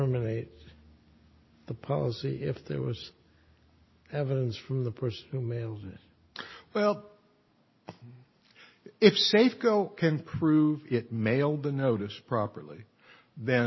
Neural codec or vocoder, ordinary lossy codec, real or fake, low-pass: none; MP3, 24 kbps; real; 7.2 kHz